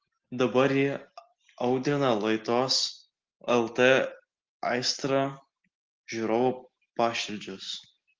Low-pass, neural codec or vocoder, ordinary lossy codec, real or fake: 7.2 kHz; none; Opus, 16 kbps; real